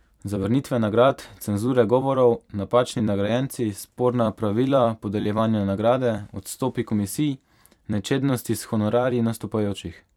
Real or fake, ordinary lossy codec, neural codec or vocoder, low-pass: fake; none; vocoder, 44.1 kHz, 128 mel bands every 256 samples, BigVGAN v2; 19.8 kHz